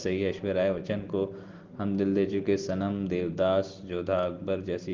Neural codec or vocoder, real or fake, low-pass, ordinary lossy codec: none; real; 7.2 kHz; Opus, 16 kbps